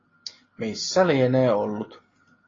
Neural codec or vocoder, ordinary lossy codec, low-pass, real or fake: none; AAC, 32 kbps; 7.2 kHz; real